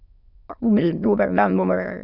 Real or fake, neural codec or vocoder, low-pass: fake; autoencoder, 22.05 kHz, a latent of 192 numbers a frame, VITS, trained on many speakers; 5.4 kHz